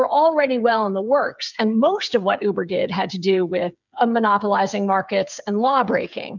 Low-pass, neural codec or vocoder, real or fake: 7.2 kHz; codec, 16 kHz, 8 kbps, FreqCodec, smaller model; fake